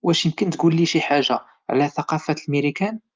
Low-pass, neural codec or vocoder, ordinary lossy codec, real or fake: 7.2 kHz; none; Opus, 32 kbps; real